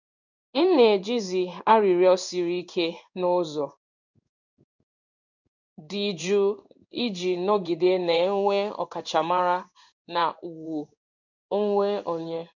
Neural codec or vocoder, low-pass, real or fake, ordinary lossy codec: codec, 16 kHz in and 24 kHz out, 1 kbps, XY-Tokenizer; 7.2 kHz; fake; none